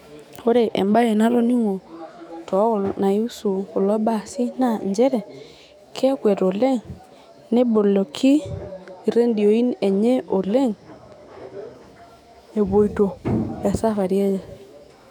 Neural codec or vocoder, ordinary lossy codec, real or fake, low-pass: autoencoder, 48 kHz, 128 numbers a frame, DAC-VAE, trained on Japanese speech; none; fake; 19.8 kHz